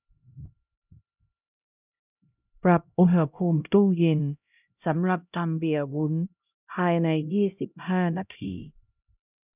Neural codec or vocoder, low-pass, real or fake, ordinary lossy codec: codec, 16 kHz, 0.5 kbps, X-Codec, HuBERT features, trained on LibriSpeech; 3.6 kHz; fake; none